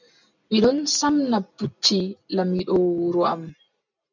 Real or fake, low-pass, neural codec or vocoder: real; 7.2 kHz; none